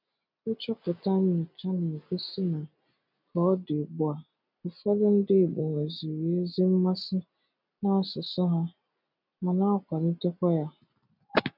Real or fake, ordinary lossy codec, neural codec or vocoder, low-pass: real; none; none; 5.4 kHz